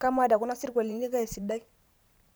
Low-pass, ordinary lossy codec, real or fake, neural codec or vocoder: none; none; fake; vocoder, 44.1 kHz, 128 mel bands every 512 samples, BigVGAN v2